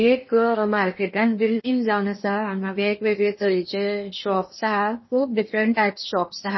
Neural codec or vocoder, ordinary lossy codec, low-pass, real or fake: codec, 16 kHz in and 24 kHz out, 0.8 kbps, FocalCodec, streaming, 65536 codes; MP3, 24 kbps; 7.2 kHz; fake